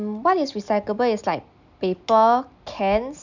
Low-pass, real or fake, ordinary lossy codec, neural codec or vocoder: 7.2 kHz; real; none; none